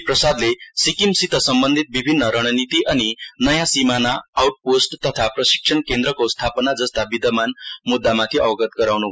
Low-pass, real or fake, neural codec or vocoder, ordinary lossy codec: none; real; none; none